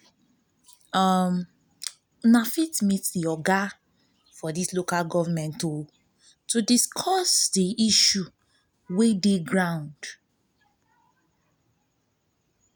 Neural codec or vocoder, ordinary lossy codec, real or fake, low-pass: none; none; real; none